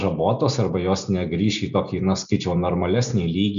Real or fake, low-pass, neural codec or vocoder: real; 7.2 kHz; none